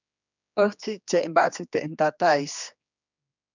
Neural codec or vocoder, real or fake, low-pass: codec, 16 kHz, 2 kbps, X-Codec, HuBERT features, trained on general audio; fake; 7.2 kHz